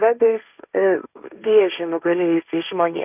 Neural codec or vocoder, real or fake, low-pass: codec, 16 kHz, 1.1 kbps, Voila-Tokenizer; fake; 3.6 kHz